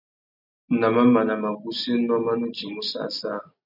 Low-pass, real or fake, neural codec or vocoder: 5.4 kHz; real; none